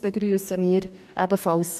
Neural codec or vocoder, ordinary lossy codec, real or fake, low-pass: codec, 44.1 kHz, 2.6 kbps, DAC; none; fake; 14.4 kHz